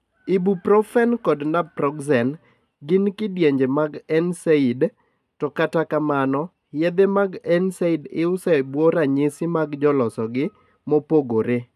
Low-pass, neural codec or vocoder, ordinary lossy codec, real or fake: 14.4 kHz; none; none; real